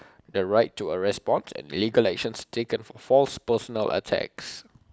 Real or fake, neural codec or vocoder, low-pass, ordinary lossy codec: real; none; none; none